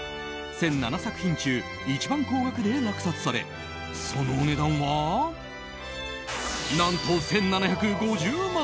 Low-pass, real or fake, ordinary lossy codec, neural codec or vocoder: none; real; none; none